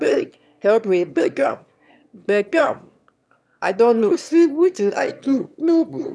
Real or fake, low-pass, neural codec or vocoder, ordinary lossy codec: fake; none; autoencoder, 22.05 kHz, a latent of 192 numbers a frame, VITS, trained on one speaker; none